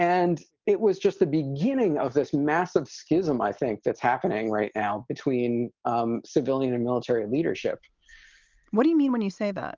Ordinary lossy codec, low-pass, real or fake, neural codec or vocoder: Opus, 24 kbps; 7.2 kHz; real; none